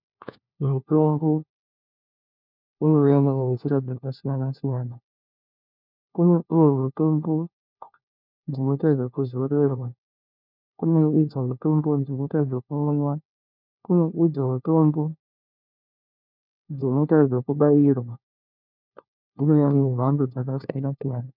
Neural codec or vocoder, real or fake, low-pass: codec, 16 kHz, 1 kbps, FunCodec, trained on LibriTTS, 50 frames a second; fake; 5.4 kHz